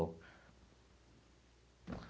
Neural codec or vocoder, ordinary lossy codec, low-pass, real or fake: none; none; none; real